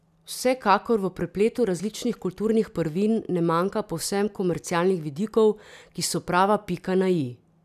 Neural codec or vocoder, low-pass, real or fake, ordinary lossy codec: none; 14.4 kHz; real; none